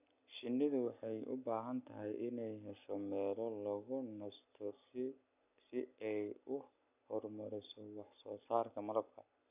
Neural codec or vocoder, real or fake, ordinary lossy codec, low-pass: none; real; none; 3.6 kHz